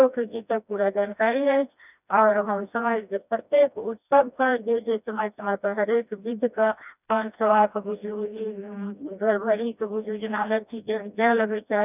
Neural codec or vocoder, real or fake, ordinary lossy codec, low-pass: codec, 16 kHz, 1 kbps, FreqCodec, smaller model; fake; none; 3.6 kHz